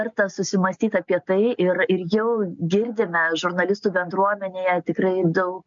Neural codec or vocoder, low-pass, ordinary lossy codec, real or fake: none; 7.2 kHz; MP3, 64 kbps; real